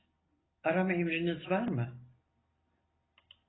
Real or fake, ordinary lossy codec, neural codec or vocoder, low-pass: real; AAC, 16 kbps; none; 7.2 kHz